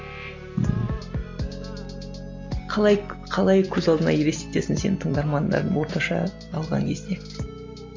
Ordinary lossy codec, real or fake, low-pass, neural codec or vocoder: MP3, 48 kbps; real; 7.2 kHz; none